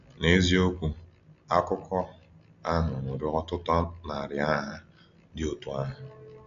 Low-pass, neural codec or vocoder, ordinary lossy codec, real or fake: 7.2 kHz; none; none; real